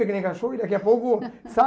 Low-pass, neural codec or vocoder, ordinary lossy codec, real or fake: none; none; none; real